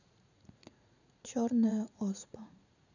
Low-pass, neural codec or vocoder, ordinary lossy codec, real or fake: 7.2 kHz; vocoder, 44.1 kHz, 80 mel bands, Vocos; none; fake